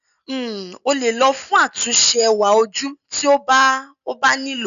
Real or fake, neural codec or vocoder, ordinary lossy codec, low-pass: real; none; none; 7.2 kHz